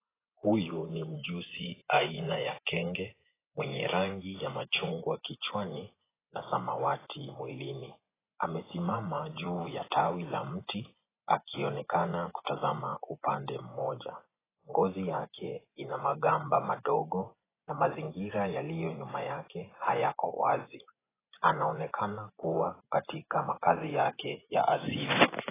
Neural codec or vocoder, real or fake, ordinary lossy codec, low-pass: vocoder, 24 kHz, 100 mel bands, Vocos; fake; AAC, 16 kbps; 3.6 kHz